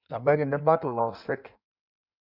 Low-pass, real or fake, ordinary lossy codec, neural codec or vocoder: 5.4 kHz; fake; none; codec, 16 kHz in and 24 kHz out, 1.1 kbps, FireRedTTS-2 codec